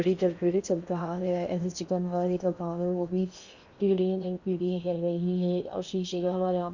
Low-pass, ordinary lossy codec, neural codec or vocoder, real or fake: 7.2 kHz; Opus, 64 kbps; codec, 16 kHz in and 24 kHz out, 0.8 kbps, FocalCodec, streaming, 65536 codes; fake